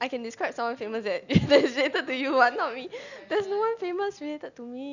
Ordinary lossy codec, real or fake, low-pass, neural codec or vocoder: none; real; 7.2 kHz; none